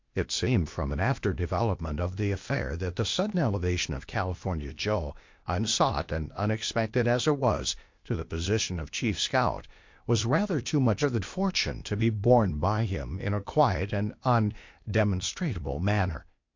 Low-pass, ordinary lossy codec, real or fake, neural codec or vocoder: 7.2 kHz; MP3, 48 kbps; fake; codec, 16 kHz, 0.8 kbps, ZipCodec